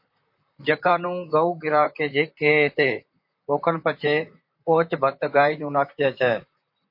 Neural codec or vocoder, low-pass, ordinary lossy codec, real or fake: vocoder, 44.1 kHz, 128 mel bands, Pupu-Vocoder; 5.4 kHz; MP3, 32 kbps; fake